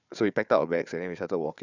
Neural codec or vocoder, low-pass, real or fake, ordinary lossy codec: codec, 16 kHz, 4 kbps, FunCodec, trained on Chinese and English, 50 frames a second; 7.2 kHz; fake; none